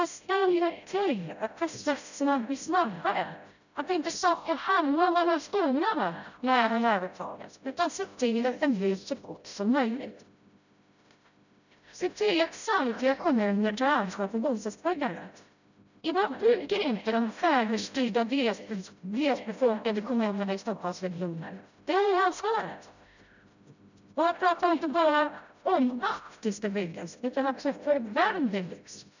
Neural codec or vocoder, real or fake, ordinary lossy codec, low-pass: codec, 16 kHz, 0.5 kbps, FreqCodec, smaller model; fake; none; 7.2 kHz